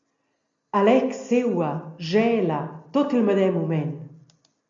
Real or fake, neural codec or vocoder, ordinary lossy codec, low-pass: real; none; MP3, 48 kbps; 7.2 kHz